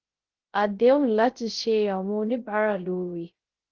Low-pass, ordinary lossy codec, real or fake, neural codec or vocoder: 7.2 kHz; Opus, 16 kbps; fake; codec, 16 kHz, 0.3 kbps, FocalCodec